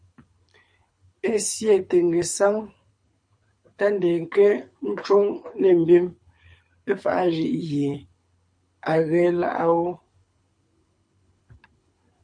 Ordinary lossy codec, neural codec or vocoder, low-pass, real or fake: MP3, 48 kbps; codec, 24 kHz, 6 kbps, HILCodec; 9.9 kHz; fake